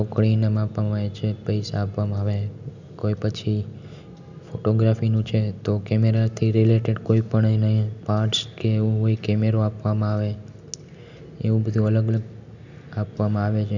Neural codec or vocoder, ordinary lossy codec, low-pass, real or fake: none; none; 7.2 kHz; real